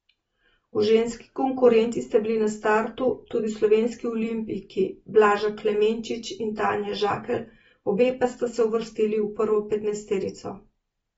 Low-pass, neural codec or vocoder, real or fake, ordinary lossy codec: 19.8 kHz; none; real; AAC, 24 kbps